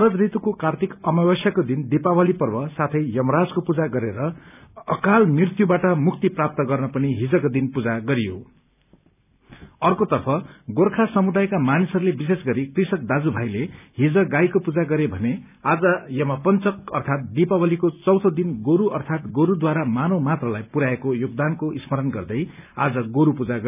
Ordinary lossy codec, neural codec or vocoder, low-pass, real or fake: none; none; 3.6 kHz; real